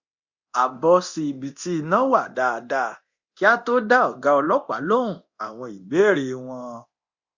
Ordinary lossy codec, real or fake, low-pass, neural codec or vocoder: Opus, 64 kbps; fake; 7.2 kHz; codec, 24 kHz, 0.9 kbps, DualCodec